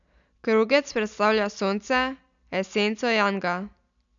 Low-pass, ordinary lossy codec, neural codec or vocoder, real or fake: 7.2 kHz; none; none; real